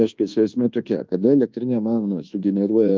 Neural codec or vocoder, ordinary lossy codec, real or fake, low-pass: codec, 24 kHz, 1.2 kbps, DualCodec; Opus, 32 kbps; fake; 7.2 kHz